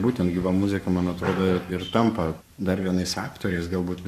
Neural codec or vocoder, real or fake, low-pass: codec, 44.1 kHz, 7.8 kbps, Pupu-Codec; fake; 14.4 kHz